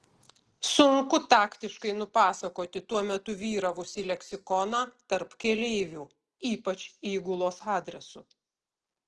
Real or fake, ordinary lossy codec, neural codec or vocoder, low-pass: real; Opus, 16 kbps; none; 10.8 kHz